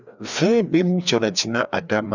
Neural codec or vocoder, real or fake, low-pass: codec, 16 kHz, 2 kbps, FreqCodec, larger model; fake; 7.2 kHz